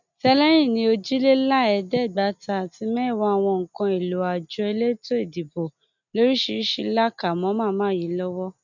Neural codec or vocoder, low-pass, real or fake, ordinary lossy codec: none; 7.2 kHz; real; none